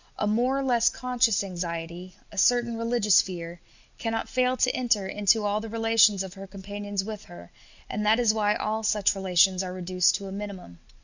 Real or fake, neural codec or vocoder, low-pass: real; none; 7.2 kHz